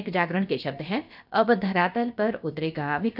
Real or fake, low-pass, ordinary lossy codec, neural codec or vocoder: fake; 5.4 kHz; none; codec, 16 kHz, about 1 kbps, DyCAST, with the encoder's durations